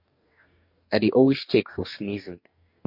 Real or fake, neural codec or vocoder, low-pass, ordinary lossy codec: fake; codec, 44.1 kHz, 2.6 kbps, DAC; 5.4 kHz; MP3, 32 kbps